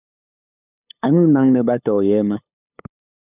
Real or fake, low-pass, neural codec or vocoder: fake; 3.6 kHz; codec, 16 kHz, 8 kbps, FunCodec, trained on LibriTTS, 25 frames a second